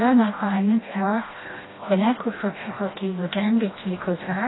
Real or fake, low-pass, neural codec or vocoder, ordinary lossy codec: fake; 7.2 kHz; codec, 16 kHz, 1 kbps, FreqCodec, smaller model; AAC, 16 kbps